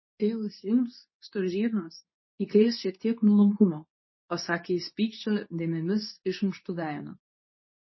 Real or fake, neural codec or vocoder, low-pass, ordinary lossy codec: fake; codec, 24 kHz, 0.9 kbps, WavTokenizer, medium speech release version 2; 7.2 kHz; MP3, 24 kbps